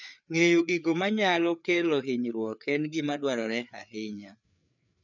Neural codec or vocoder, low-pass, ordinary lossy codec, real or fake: codec, 16 kHz, 4 kbps, FreqCodec, larger model; 7.2 kHz; none; fake